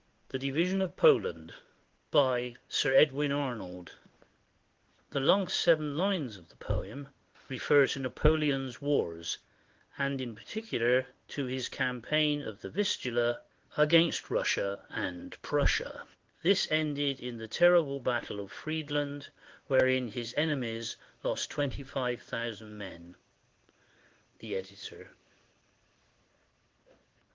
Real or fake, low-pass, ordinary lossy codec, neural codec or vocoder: fake; 7.2 kHz; Opus, 16 kbps; codec, 16 kHz in and 24 kHz out, 1 kbps, XY-Tokenizer